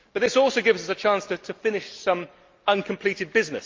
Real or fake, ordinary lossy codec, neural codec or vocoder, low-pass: real; Opus, 32 kbps; none; 7.2 kHz